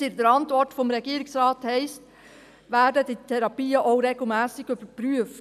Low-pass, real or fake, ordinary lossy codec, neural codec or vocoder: 14.4 kHz; real; none; none